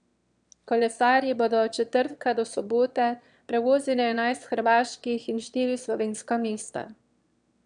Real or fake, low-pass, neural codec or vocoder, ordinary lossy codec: fake; 9.9 kHz; autoencoder, 22.05 kHz, a latent of 192 numbers a frame, VITS, trained on one speaker; none